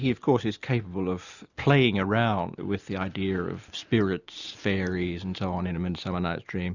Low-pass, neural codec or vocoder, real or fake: 7.2 kHz; none; real